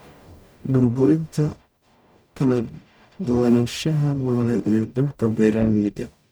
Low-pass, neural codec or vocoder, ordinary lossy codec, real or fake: none; codec, 44.1 kHz, 0.9 kbps, DAC; none; fake